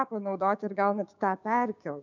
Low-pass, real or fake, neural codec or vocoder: 7.2 kHz; fake; codec, 16 kHz, 6 kbps, DAC